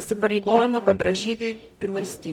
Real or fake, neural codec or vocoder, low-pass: fake; codec, 44.1 kHz, 0.9 kbps, DAC; 19.8 kHz